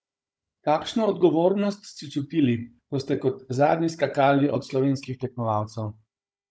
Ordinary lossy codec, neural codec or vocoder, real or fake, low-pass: none; codec, 16 kHz, 16 kbps, FunCodec, trained on Chinese and English, 50 frames a second; fake; none